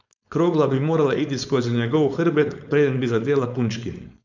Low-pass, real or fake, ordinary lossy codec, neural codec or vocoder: 7.2 kHz; fake; none; codec, 16 kHz, 4.8 kbps, FACodec